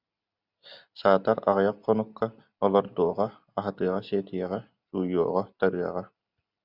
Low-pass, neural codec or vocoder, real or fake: 5.4 kHz; none; real